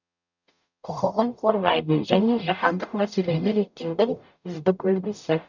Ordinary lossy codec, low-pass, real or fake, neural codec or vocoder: none; 7.2 kHz; fake; codec, 44.1 kHz, 0.9 kbps, DAC